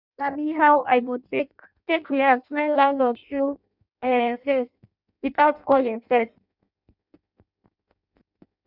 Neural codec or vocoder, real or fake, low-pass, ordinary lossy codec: codec, 16 kHz in and 24 kHz out, 0.6 kbps, FireRedTTS-2 codec; fake; 5.4 kHz; none